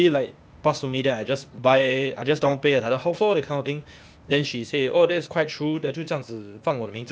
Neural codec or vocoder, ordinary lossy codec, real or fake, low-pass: codec, 16 kHz, 0.8 kbps, ZipCodec; none; fake; none